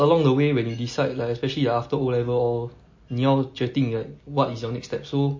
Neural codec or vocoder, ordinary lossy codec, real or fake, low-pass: none; MP3, 32 kbps; real; 7.2 kHz